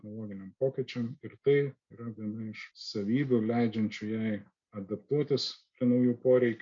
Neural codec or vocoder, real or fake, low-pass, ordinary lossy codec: none; real; 7.2 kHz; MP3, 48 kbps